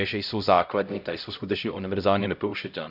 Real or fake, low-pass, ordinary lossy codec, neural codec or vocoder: fake; 5.4 kHz; none; codec, 16 kHz, 0.5 kbps, X-Codec, HuBERT features, trained on LibriSpeech